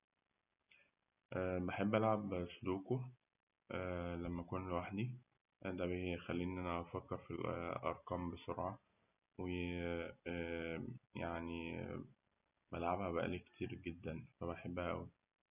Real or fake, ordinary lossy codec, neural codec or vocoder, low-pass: real; none; none; 3.6 kHz